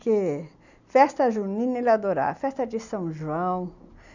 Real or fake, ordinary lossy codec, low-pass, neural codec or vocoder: real; none; 7.2 kHz; none